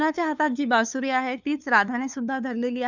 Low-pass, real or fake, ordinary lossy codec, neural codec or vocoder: 7.2 kHz; fake; none; codec, 16 kHz, 2 kbps, FunCodec, trained on LibriTTS, 25 frames a second